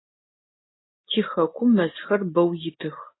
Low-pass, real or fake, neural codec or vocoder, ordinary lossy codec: 7.2 kHz; real; none; AAC, 16 kbps